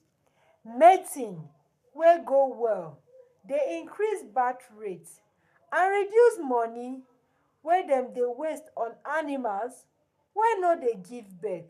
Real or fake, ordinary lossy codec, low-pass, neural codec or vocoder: fake; none; 14.4 kHz; codec, 44.1 kHz, 7.8 kbps, Pupu-Codec